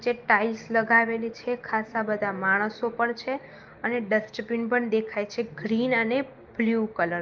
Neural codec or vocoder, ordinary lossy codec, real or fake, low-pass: none; Opus, 32 kbps; real; 7.2 kHz